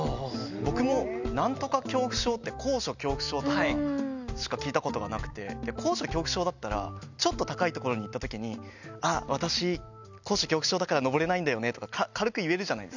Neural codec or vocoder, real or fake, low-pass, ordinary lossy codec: none; real; 7.2 kHz; none